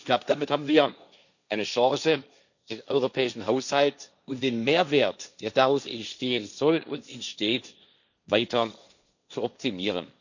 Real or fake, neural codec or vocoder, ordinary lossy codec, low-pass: fake; codec, 16 kHz, 1.1 kbps, Voila-Tokenizer; none; 7.2 kHz